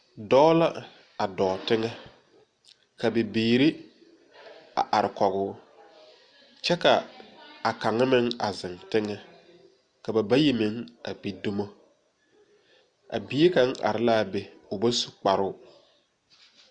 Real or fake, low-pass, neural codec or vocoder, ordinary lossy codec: real; 9.9 kHz; none; Opus, 64 kbps